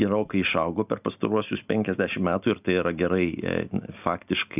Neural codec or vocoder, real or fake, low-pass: none; real; 3.6 kHz